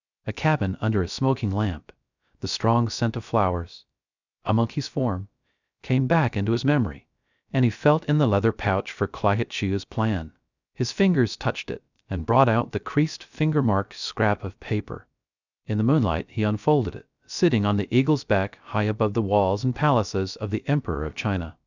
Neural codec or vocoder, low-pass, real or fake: codec, 16 kHz, 0.3 kbps, FocalCodec; 7.2 kHz; fake